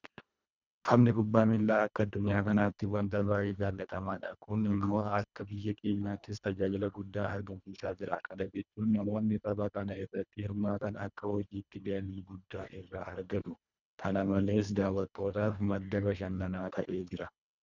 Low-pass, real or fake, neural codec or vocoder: 7.2 kHz; fake; codec, 24 kHz, 1.5 kbps, HILCodec